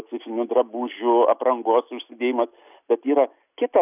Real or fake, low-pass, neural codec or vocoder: real; 3.6 kHz; none